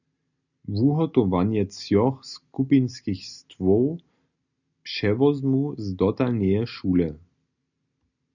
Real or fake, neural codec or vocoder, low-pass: real; none; 7.2 kHz